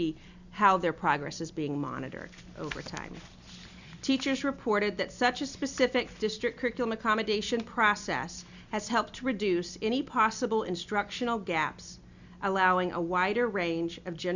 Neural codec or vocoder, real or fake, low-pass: none; real; 7.2 kHz